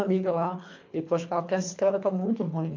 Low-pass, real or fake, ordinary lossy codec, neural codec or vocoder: 7.2 kHz; fake; MP3, 48 kbps; codec, 24 kHz, 3 kbps, HILCodec